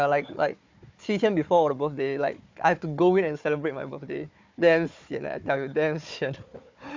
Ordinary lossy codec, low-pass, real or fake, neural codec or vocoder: MP3, 64 kbps; 7.2 kHz; fake; codec, 16 kHz, 4 kbps, FunCodec, trained on Chinese and English, 50 frames a second